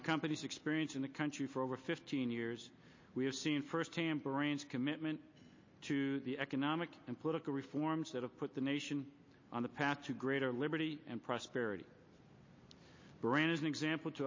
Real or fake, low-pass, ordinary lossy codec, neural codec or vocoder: real; 7.2 kHz; MP3, 32 kbps; none